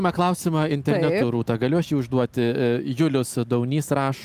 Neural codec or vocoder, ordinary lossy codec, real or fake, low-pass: none; Opus, 32 kbps; real; 14.4 kHz